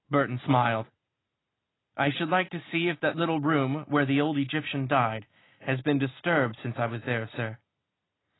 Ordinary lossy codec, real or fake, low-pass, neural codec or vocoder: AAC, 16 kbps; real; 7.2 kHz; none